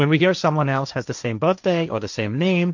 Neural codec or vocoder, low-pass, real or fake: codec, 16 kHz, 1.1 kbps, Voila-Tokenizer; 7.2 kHz; fake